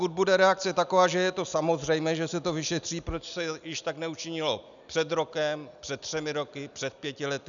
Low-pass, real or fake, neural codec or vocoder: 7.2 kHz; real; none